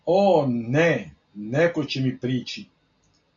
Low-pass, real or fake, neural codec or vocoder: 7.2 kHz; real; none